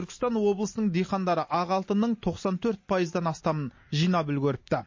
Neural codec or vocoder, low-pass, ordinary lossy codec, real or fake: none; 7.2 kHz; MP3, 32 kbps; real